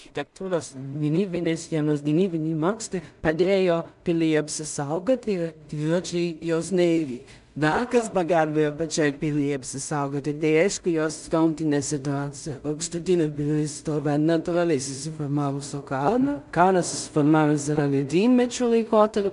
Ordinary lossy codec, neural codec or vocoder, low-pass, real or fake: MP3, 96 kbps; codec, 16 kHz in and 24 kHz out, 0.4 kbps, LongCat-Audio-Codec, two codebook decoder; 10.8 kHz; fake